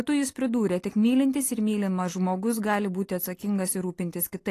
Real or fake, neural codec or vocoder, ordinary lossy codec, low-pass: real; none; AAC, 48 kbps; 14.4 kHz